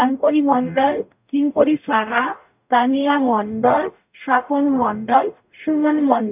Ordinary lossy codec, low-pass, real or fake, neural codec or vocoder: none; 3.6 kHz; fake; codec, 44.1 kHz, 0.9 kbps, DAC